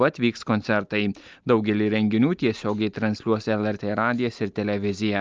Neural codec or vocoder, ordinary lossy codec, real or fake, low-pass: none; Opus, 24 kbps; real; 7.2 kHz